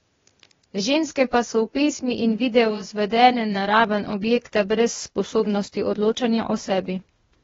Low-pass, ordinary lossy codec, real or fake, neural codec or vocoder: 7.2 kHz; AAC, 24 kbps; fake; codec, 16 kHz, 0.8 kbps, ZipCodec